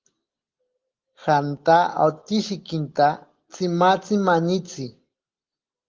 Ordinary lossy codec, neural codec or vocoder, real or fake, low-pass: Opus, 16 kbps; none; real; 7.2 kHz